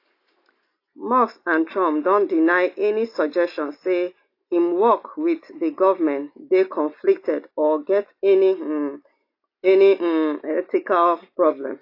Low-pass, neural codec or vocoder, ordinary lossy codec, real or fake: 5.4 kHz; none; AAC, 32 kbps; real